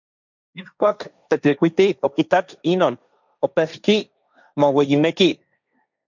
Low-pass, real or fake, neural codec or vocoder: 7.2 kHz; fake; codec, 16 kHz, 1.1 kbps, Voila-Tokenizer